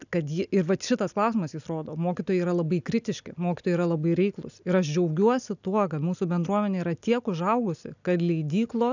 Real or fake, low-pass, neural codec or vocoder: real; 7.2 kHz; none